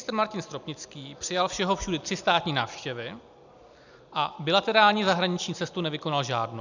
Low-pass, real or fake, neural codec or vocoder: 7.2 kHz; real; none